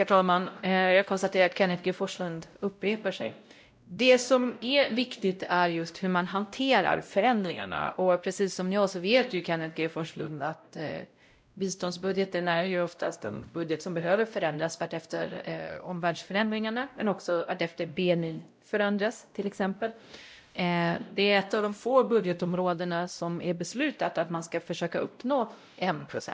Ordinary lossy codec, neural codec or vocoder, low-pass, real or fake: none; codec, 16 kHz, 0.5 kbps, X-Codec, WavLM features, trained on Multilingual LibriSpeech; none; fake